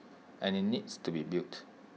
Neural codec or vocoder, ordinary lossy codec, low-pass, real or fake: none; none; none; real